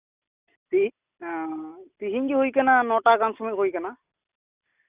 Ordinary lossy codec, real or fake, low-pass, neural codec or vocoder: Opus, 64 kbps; real; 3.6 kHz; none